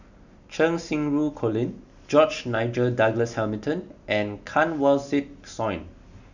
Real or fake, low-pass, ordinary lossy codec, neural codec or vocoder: real; 7.2 kHz; none; none